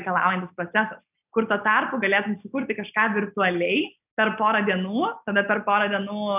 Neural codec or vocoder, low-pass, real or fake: none; 3.6 kHz; real